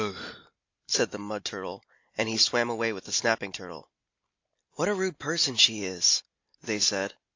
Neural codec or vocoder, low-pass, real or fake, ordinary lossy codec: none; 7.2 kHz; real; AAC, 48 kbps